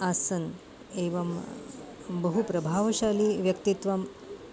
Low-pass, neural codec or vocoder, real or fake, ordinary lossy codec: none; none; real; none